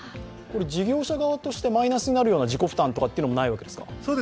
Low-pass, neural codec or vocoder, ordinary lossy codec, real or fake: none; none; none; real